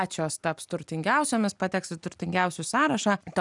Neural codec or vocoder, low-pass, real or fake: none; 10.8 kHz; real